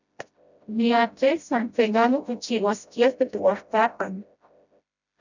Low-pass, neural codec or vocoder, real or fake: 7.2 kHz; codec, 16 kHz, 0.5 kbps, FreqCodec, smaller model; fake